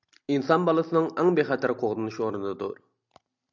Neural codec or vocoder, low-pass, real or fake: none; 7.2 kHz; real